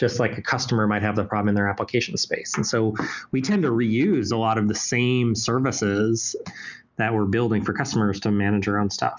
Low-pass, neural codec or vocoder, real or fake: 7.2 kHz; none; real